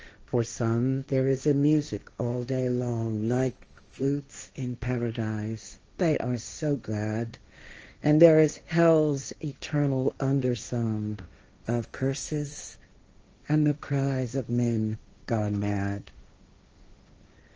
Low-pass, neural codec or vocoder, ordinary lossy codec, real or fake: 7.2 kHz; codec, 16 kHz, 1.1 kbps, Voila-Tokenizer; Opus, 24 kbps; fake